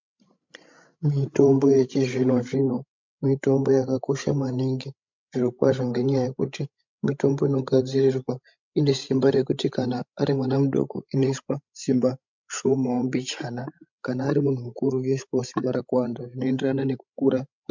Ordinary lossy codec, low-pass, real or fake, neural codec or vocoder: MP3, 64 kbps; 7.2 kHz; fake; codec, 16 kHz, 16 kbps, FreqCodec, larger model